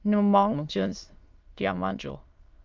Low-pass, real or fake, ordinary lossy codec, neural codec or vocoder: 7.2 kHz; fake; Opus, 24 kbps; autoencoder, 22.05 kHz, a latent of 192 numbers a frame, VITS, trained on many speakers